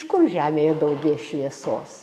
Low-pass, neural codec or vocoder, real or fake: 14.4 kHz; codec, 44.1 kHz, 7.8 kbps, DAC; fake